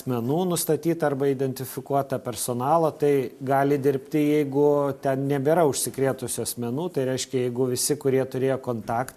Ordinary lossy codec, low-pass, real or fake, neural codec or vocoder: MP3, 64 kbps; 14.4 kHz; real; none